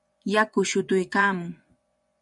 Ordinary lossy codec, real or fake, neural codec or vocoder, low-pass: MP3, 96 kbps; fake; vocoder, 24 kHz, 100 mel bands, Vocos; 10.8 kHz